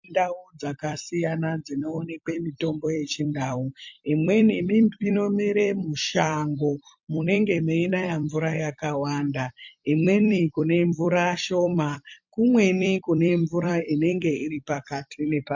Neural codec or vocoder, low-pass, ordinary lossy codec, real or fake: vocoder, 44.1 kHz, 128 mel bands every 256 samples, BigVGAN v2; 7.2 kHz; MP3, 48 kbps; fake